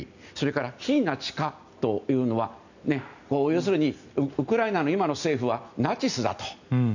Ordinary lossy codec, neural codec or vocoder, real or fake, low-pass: none; none; real; 7.2 kHz